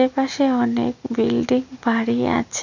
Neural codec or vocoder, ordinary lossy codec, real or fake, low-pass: none; none; real; 7.2 kHz